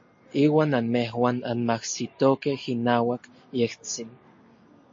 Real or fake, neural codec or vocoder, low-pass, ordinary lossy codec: real; none; 7.2 kHz; MP3, 32 kbps